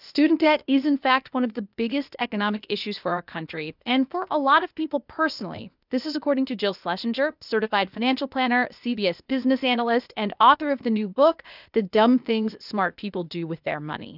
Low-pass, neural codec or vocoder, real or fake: 5.4 kHz; codec, 16 kHz, 0.8 kbps, ZipCodec; fake